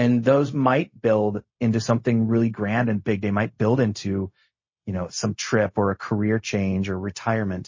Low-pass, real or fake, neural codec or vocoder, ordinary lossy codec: 7.2 kHz; fake; codec, 16 kHz, 0.4 kbps, LongCat-Audio-Codec; MP3, 32 kbps